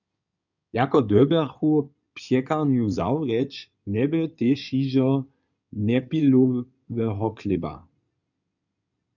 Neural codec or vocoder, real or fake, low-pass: codec, 16 kHz in and 24 kHz out, 2.2 kbps, FireRedTTS-2 codec; fake; 7.2 kHz